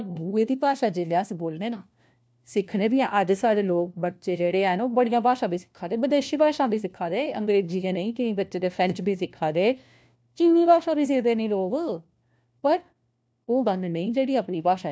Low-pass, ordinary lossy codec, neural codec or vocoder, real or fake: none; none; codec, 16 kHz, 1 kbps, FunCodec, trained on LibriTTS, 50 frames a second; fake